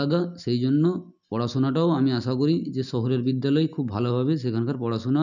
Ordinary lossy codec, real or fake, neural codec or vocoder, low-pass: none; real; none; 7.2 kHz